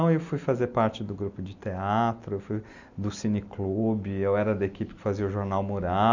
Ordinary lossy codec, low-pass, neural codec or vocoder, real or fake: none; 7.2 kHz; none; real